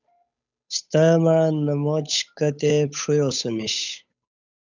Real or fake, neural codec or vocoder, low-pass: fake; codec, 16 kHz, 8 kbps, FunCodec, trained on Chinese and English, 25 frames a second; 7.2 kHz